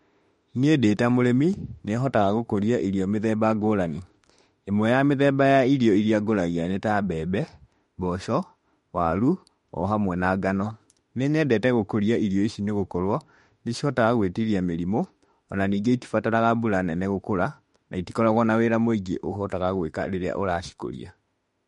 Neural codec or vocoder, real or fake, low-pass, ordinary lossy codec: autoencoder, 48 kHz, 32 numbers a frame, DAC-VAE, trained on Japanese speech; fake; 19.8 kHz; MP3, 48 kbps